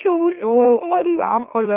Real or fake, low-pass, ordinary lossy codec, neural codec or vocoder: fake; 3.6 kHz; Opus, 32 kbps; autoencoder, 44.1 kHz, a latent of 192 numbers a frame, MeloTTS